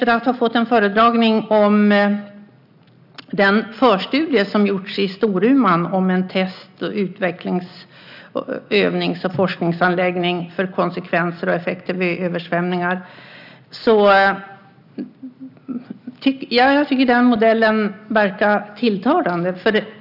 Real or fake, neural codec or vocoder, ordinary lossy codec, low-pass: real; none; none; 5.4 kHz